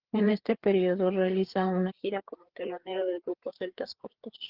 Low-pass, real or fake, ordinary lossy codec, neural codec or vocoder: 5.4 kHz; fake; Opus, 16 kbps; codec, 16 kHz, 4 kbps, FreqCodec, larger model